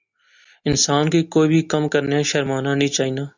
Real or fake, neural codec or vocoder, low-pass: real; none; 7.2 kHz